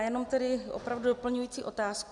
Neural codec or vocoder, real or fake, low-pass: none; real; 10.8 kHz